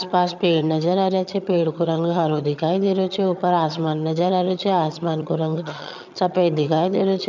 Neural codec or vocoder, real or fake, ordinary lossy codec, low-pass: vocoder, 22.05 kHz, 80 mel bands, HiFi-GAN; fake; none; 7.2 kHz